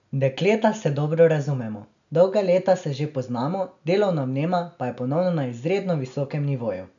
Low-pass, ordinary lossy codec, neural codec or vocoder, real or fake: 7.2 kHz; none; none; real